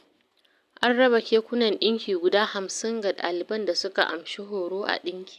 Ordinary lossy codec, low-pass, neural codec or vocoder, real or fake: none; 14.4 kHz; none; real